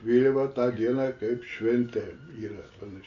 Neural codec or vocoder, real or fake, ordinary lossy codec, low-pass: none; real; AAC, 48 kbps; 7.2 kHz